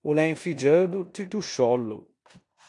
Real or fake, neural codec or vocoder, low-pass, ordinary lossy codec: fake; codec, 16 kHz in and 24 kHz out, 0.9 kbps, LongCat-Audio-Codec, four codebook decoder; 10.8 kHz; AAC, 64 kbps